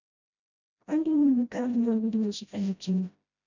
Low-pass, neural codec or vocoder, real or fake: 7.2 kHz; codec, 16 kHz, 0.5 kbps, FreqCodec, smaller model; fake